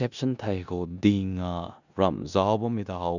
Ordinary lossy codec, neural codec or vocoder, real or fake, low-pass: none; codec, 16 kHz in and 24 kHz out, 0.9 kbps, LongCat-Audio-Codec, four codebook decoder; fake; 7.2 kHz